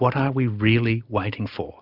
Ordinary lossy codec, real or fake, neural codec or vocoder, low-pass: Opus, 64 kbps; real; none; 5.4 kHz